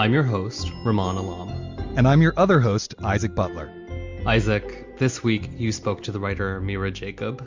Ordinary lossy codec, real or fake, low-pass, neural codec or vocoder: MP3, 64 kbps; real; 7.2 kHz; none